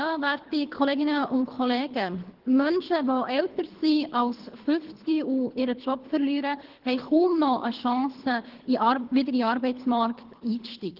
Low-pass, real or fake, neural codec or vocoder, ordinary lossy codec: 5.4 kHz; fake; codec, 24 kHz, 3 kbps, HILCodec; Opus, 16 kbps